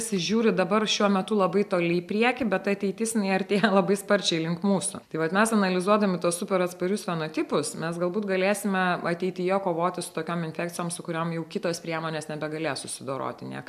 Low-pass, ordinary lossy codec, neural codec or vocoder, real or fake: 14.4 kHz; AAC, 96 kbps; none; real